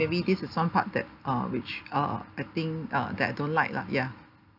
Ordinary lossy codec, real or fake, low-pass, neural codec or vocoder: none; real; 5.4 kHz; none